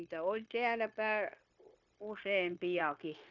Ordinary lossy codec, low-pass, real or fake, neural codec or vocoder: none; 7.2 kHz; fake; codec, 16 kHz, 0.9 kbps, LongCat-Audio-Codec